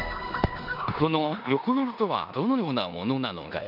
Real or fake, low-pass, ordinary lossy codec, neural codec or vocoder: fake; 5.4 kHz; none; codec, 16 kHz in and 24 kHz out, 0.9 kbps, LongCat-Audio-Codec, four codebook decoder